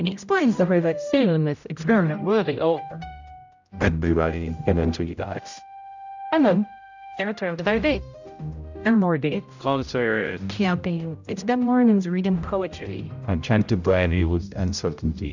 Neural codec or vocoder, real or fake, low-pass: codec, 16 kHz, 0.5 kbps, X-Codec, HuBERT features, trained on general audio; fake; 7.2 kHz